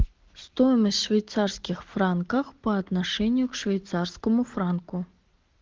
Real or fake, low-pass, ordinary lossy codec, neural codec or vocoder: real; 7.2 kHz; Opus, 16 kbps; none